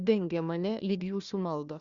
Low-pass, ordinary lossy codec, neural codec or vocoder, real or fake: 7.2 kHz; Opus, 64 kbps; codec, 16 kHz, 1 kbps, FunCodec, trained on Chinese and English, 50 frames a second; fake